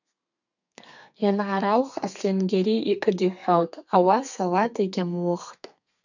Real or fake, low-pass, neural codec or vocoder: fake; 7.2 kHz; codec, 32 kHz, 1.9 kbps, SNAC